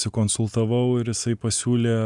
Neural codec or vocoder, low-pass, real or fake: none; 10.8 kHz; real